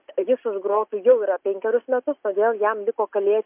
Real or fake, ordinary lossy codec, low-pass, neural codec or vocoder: real; MP3, 32 kbps; 3.6 kHz; none